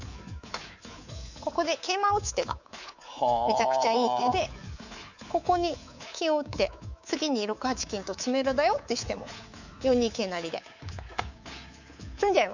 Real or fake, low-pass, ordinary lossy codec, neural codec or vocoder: fake; 7.2 kHz; none; codec, 24 kHz, 3.1 kbps, DualCodec